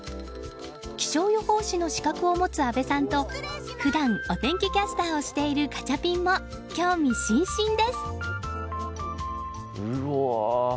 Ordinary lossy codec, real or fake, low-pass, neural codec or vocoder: none; real; none; none